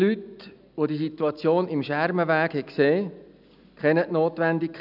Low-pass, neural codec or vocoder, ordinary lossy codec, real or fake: 5.4 kHz; none; none; real